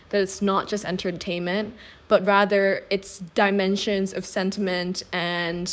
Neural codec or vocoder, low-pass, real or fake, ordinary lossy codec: codec, 16 kHz, 6 kbps, DAC; none; fake; none